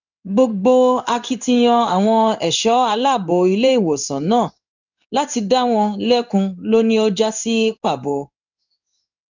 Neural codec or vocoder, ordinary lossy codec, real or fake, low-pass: codec, 16 kHz in and 24 kHz out, 1 kbps, XY-Tokenizer; none; fake; 7.2 kHz